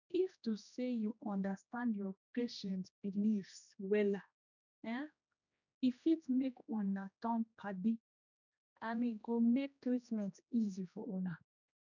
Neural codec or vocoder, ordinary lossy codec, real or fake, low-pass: codec, 16 kHz, 1 kbps, X-Codec, HuBERT features, trained on general audio; none; fake; 7.2 kHz